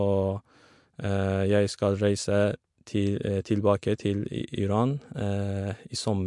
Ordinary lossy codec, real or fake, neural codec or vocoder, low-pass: MP3, 64 kbps; real; none; 10.8 kHz